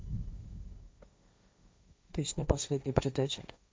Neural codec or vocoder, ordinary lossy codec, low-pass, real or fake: codec, 16 kHz, 1.1 kbps, Voila-Tokenizer; none; none; fake